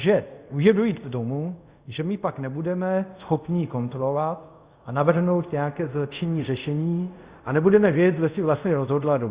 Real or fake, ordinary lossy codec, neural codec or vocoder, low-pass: fake; Opus, 64 kbps; codec, 24 kHz, 0.5 kbps, DualCodec; 3.6 kHz